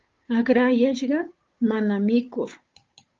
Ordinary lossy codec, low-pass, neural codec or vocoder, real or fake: Opus, 32 kbps; 7.2 kHz; codec, 16 kHz, 8 kbps, FunCodec, trained on Chinese and English, 25 frames a second; fake